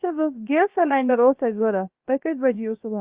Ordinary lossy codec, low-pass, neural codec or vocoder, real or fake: Opus, 24 kbps; 3.6 kHz; codec, 16 kHz, about 1 kbps, DyCAST, with the encoder's durations; fake